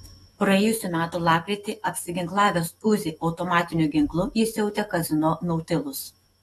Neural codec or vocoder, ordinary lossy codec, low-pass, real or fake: autoencoder, 48 kHz, 128 numbers a frame, DAC-VAE, trained on Japanese speech; AAC, 32 kbps; 19.8 kHz; fake